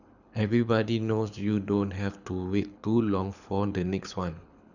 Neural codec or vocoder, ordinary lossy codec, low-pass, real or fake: codec, 24 kHz, 6 kbps, HILCodec; none; 7.2 kHz; fake